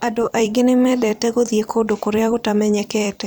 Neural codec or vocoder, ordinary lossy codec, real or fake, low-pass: vocoder, 44.1 kHz, 128 mel bands every 256 samples, BigVGAN v2; none; fake; none